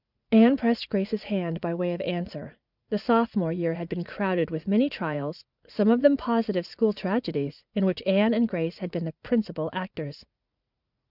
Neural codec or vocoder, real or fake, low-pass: vocoder, 44.1 kHz, 80 mel bands, Vocos; fake; 5.4 kHz